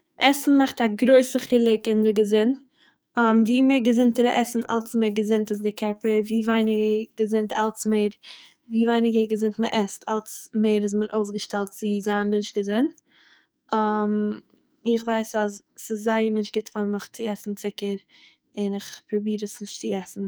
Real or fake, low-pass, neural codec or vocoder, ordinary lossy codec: fake; none; codec, 44.1 kHz, 2.6 kbps, SNAC; none